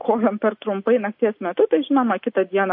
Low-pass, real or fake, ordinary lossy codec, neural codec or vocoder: 5.4 kHz; real; MP3, 32 kbps; none